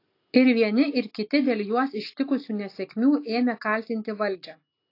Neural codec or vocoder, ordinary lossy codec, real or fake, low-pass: none; AAC, 32 kbps; real; 5.4 kHz